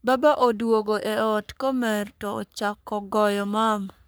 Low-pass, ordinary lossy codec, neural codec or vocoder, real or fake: none; none; codec, 44.1 kHz, 3.4 kbps, Pupu-Codec; fake